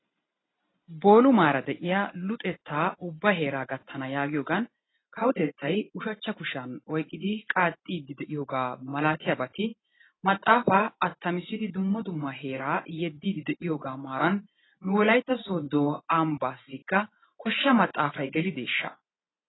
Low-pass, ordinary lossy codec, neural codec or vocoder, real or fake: 7.2 kHz; AAC, 16 kbps; none; real